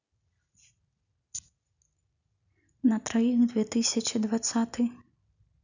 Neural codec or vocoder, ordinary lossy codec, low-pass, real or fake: none; none; 7.2 kHz; real